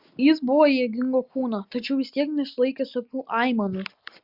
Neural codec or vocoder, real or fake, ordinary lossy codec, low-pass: none; real; Opus, 64 kbps; 5.4 kHz